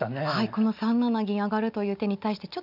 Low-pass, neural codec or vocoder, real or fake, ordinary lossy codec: 5.4 kHz; none; real; none